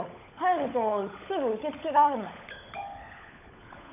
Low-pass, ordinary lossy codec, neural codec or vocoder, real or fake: 3.6 kHz; none; codec, 16 kHz, 16 kbps, FunCodec, trained on LibriTTS, 50 frames a second; fake